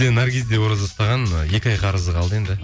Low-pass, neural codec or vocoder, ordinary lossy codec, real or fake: none; none; none; real